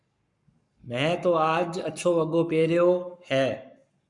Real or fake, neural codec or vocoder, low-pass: fake; codec, 44.1 kHz, 7.8 kbps, Pupu-Codec; 10.8 kHz